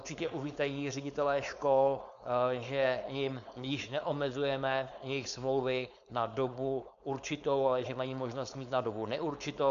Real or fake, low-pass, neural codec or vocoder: fake; 7.2 kHz; codec, 16 kHz, 4.8 kbps, FACodec